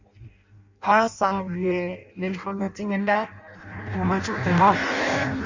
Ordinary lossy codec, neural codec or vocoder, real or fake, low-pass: Opus, 64 kbps; codec, 16 kHz in and 24 kHz out, 0.6 kbps, FireRedTTS-2 codec; fake; 7.2 kHz